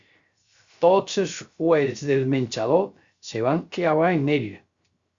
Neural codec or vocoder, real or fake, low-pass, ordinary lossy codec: codec, 16 kHz, 0.3 kbps, FocalCodec; fake; 7.2 kHz; Opus, 64 kbps